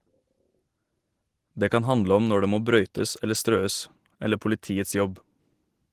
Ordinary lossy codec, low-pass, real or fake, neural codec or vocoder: Opus, 16 kbps; 14.4 kHz; real; none